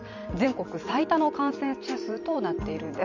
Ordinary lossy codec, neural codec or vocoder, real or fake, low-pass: none; vocoder, 44.1 kHz, 128 mel bands every 512 samples, BigVGAN v2; fake; 7.2 kHz